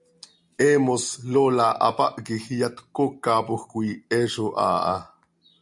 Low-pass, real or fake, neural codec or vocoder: 10.8 kHz; real; none